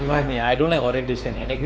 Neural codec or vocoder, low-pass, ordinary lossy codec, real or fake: codec, 16 kHz, 4 kbps, X-Codec, WavLM features, trained on Multilingual LibriSpeech; none; none; fake